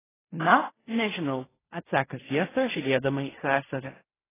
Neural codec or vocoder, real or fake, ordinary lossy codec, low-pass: codec, 16 kHz in and 24 kHz out, 0.4 kbps, LongCat-Audio-Codec, fine tuned four codebook decoder; fake; AAC, 16 kbps; 3.6 kHz